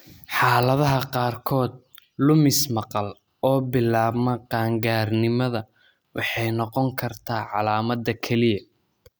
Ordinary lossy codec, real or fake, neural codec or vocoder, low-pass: none; real; none; none